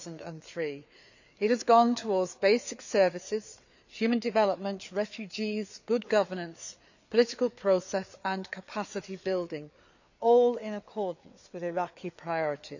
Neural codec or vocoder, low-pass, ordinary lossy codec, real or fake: codec, 16 kHz, 4 kbps, FreqCodec, larger model; 7.2 kHz; none; fake